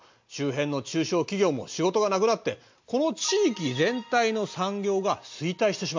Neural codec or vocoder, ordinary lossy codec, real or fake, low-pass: none; MP3, 48 kbps; real; 7.2 kHz